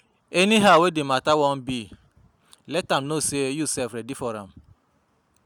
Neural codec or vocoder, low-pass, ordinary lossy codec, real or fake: none; none; none; real